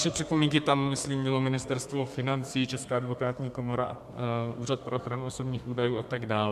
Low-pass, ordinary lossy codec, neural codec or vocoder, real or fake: 14.4 kHz; MP3, 96 kbps; codec, 32 kHz, 1.9 kbps, SNAC; fake